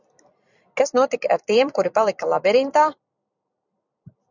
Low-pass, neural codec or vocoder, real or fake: 7.2 kHz; vocoder, 44.1 kHz, 128 mel bands every 256 samples, BigVGAN v2; fake